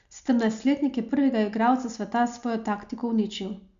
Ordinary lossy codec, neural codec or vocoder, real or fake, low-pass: Opus, 64 kbps; none; real; 7.2 kHz